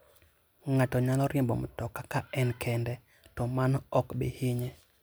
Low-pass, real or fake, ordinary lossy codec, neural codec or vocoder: none; real; none; none